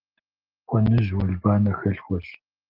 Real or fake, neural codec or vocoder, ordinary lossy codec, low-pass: real; none; Opus, 16 kbps; 5.4 kHz